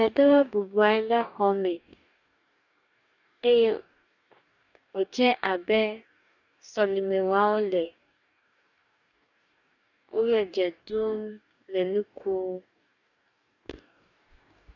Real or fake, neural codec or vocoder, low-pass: fake; codec, 44.1 kHz, 2.6 kbps, DAC; 7.2 kHz